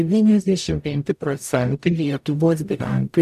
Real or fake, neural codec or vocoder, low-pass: fake; codec, 44.1 kHz, 0.9 kbps, DAC; 14.4 kHz